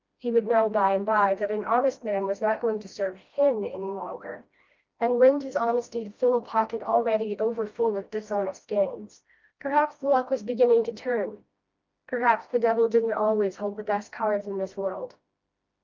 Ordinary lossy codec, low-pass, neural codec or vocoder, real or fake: Opus, 32 kbps; 7.2 kHz; codec, 16 kHz, 1 kbps, FreqCodec, smaller model; fake